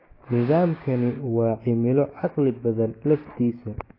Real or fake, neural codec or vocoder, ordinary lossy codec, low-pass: fake; codec, 16 kHz, 6 kbps, DAC; AAC, 24 kbps; 5.4 kHz